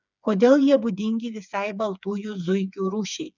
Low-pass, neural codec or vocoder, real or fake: 7.2 kHz; codec, 16 kHz, 4 kbps, FreqCodec, smaller model; fake